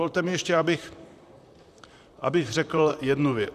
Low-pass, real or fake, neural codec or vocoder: 14.4 kHz; fake; vocoder, 44.1 kHz, 128 mel bands, Pupu-Vocoder